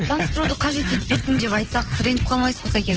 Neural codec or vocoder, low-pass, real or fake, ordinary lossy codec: codec, 16 kHz in and 24 kHz out, 2.2 kbps, FireRedTTS-2 codec; 7.2 kHz; fake; Opus, 16 kbps